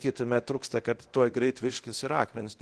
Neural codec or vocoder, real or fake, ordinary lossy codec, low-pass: codec, 24 kHz, 0.9 kbps, DualCodec; fake; Opus, 16 kbps; 10.8 kHz